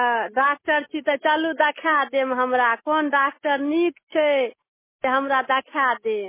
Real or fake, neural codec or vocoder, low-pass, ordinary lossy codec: real; none; 3.6 kHz; MP3, 16 kbps